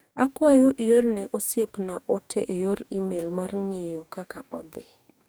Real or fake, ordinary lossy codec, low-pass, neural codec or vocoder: fake; none; none; codec, 44.1 kHz, 2.6 kbps, DAC